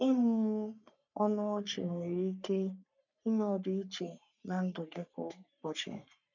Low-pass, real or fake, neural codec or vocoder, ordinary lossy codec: 7.2 kHz; fake; codec, 44.1 kHz, 3.4 kbps, Pupu-Codec; none